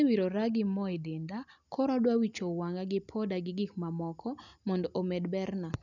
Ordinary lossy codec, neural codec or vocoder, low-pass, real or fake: none; none; 7.2 kHz; real